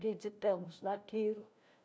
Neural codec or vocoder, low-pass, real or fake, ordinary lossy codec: codec, 16 kHz, 1 kbps, FunCodec, trained on LibriTTS, 50 frames a second; none; fake; none